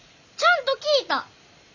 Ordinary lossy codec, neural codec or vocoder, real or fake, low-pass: none; none; real; 7.2 kHz